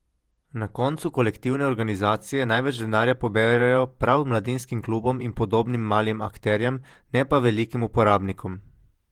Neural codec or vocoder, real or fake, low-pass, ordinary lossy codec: vocoder, 48 kHz, 128 mel bands, Vocos; fake; 19.8 kHz; Opus, 24 kbps